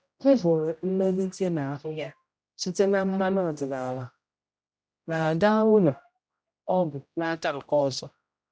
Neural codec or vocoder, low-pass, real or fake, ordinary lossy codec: codec, 16 kHz, 0.5 kbps, X-Codec, HuBERT features, trained on general audio; none; fake; none